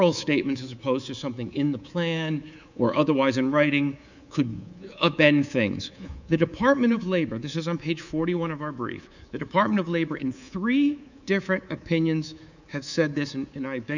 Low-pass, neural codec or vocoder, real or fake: 7.2 kHz; codec, 24 kHz, 3.1 kbps, DualCodec; fake